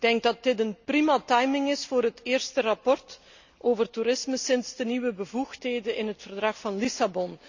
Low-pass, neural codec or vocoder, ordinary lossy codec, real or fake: 7.2 kHz; none; Opus, 64 kbps; real